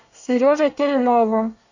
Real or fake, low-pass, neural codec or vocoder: fake; 7.2 kHz; codec, 24 kHz, 1 kbps, SNAC